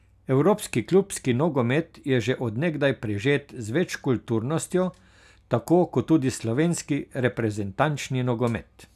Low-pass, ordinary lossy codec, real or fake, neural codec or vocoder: 14.4 kHz; none; real; none